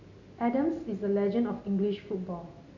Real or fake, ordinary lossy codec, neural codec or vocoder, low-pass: real; none; none; 7.2 kHz